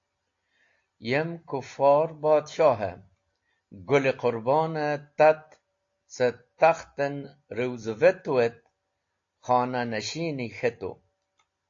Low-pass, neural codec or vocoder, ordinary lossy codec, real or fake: 7.2 kHz; none; AAC, 48 kbps; real